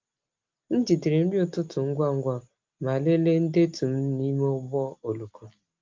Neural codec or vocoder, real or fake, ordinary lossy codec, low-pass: none; real; Opus, 24 kbps; 7.2 kHz